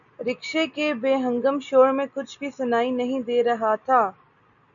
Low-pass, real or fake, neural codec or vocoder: 7.2 kHz; real; none